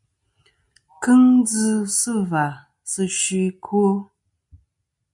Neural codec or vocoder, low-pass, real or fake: none; 10.8 kHz; real